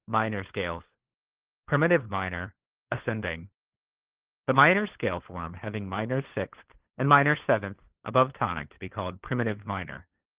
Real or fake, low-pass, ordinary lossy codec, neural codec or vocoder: fake; 3.6 kHz; Opus, 16 kbps; codec, 16 kHz, 4 kbps, FunCodec, trained on LibriTTS, 50 frames a second